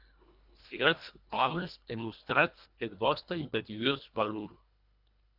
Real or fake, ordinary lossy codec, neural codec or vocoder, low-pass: fake; Opus, 64 kbps; codec, 24 kHz, 1.5 kbps, HILCodec; 5.4 kHz